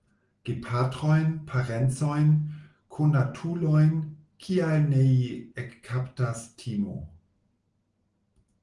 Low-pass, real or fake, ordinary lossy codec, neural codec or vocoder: 10.8 kHz; real; Opus, 24 kbps; none